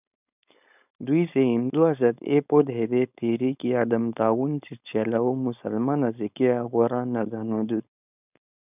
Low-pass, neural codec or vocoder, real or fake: 3.6 kHz; codec, 16 kHz, 4.8 kbps, FACodec; fake